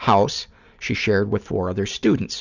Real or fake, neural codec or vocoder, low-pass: fake; vocoder, 44.1 kHz, 128 mel bands every 256 samples, BigVGAN v2; 7.2 kHz